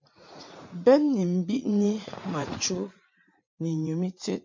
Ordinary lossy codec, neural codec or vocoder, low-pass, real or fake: MP3, 48 kbps; vocoder, 44.1 kHz, 80 mel bands, Vocos; 7.2 kHz; fake